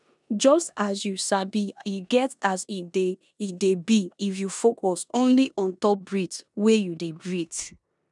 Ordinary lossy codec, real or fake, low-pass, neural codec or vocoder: none; fake; 10.8 kHz; codec, 16 kHz in and 24 kHz out, 0.9 kbps, LongCat-Audio-Codec, four codebook decoder